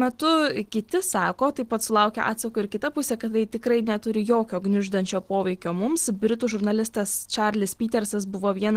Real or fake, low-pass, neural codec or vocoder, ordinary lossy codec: real; 14.4 kHz; none; Opus, 16 kbps